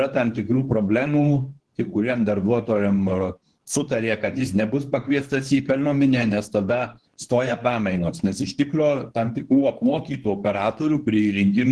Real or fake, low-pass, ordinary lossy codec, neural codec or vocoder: fake; 10.8 kHz; Opus, 16 kbps; codec, 24 kHz, 0.9 kbps, WavTokenizer, medium speech release version 2